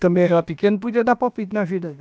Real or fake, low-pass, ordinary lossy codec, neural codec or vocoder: fake; none; none; codec, 16 kHz, about 1 kbps, DyCAST, with the encoder's durations